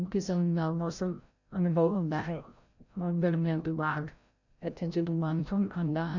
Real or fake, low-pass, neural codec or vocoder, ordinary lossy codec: fake; 7.2 kHz; codec, 16 kHz, 0.5 kbps, FreqCodec, larger model; none